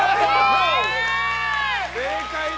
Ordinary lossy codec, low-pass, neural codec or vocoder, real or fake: none; none; none; real